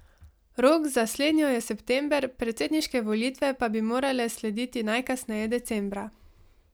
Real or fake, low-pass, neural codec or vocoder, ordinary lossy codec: real; none; none; none